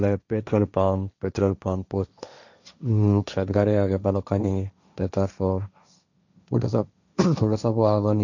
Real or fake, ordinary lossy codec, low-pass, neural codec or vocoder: fake; none; 7.2 kHz; codec, 16 kHz, 1.1 kbps, Voila-Tokenizer